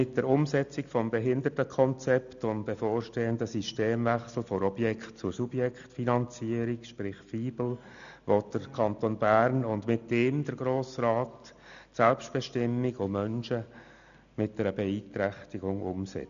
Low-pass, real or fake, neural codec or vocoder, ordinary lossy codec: 7.2 kHz; real; none; none